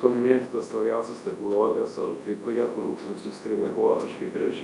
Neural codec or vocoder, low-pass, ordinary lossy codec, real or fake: codec, 24 kHz, 0.9 kbps, WavTokenizer, large speech release; 10.8 kHz; Opus, 64 kbps; fake